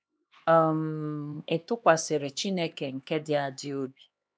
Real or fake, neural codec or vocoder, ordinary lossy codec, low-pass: fake; codec, 16 kHz, 2 kbps, X-Codec, HuBERT features, trained on LibriSpeech; none; none